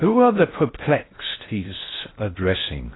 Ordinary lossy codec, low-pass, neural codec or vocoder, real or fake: AAC, 16 kbps; 7.2 kHz; codec, 16 kHz in and 24 kHz out, 0.6 kbps, FocalCodec, streaming, 4096 codes; fake